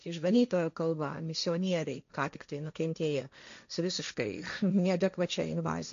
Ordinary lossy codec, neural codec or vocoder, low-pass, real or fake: MP3, 64 kbps; codec, 16 kHz, 1.1 kbps, Voila-Tokenizer; 7.2 kHz; fake